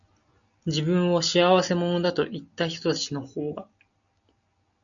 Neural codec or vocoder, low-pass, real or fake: none; 7.2 kHz; real